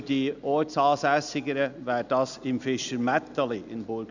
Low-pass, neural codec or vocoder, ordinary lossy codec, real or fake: 7.2 kHz; none; none; real